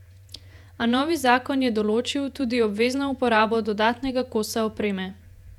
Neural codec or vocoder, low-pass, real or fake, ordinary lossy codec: vocoder, 48 kHz, 128 mel bands, Vocos; 19.8 kHz; fake; none